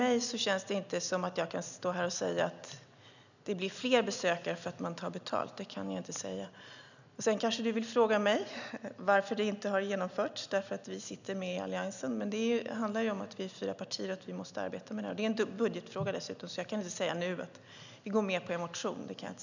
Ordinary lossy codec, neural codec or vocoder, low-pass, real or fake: none; none; 7.2 kHz; real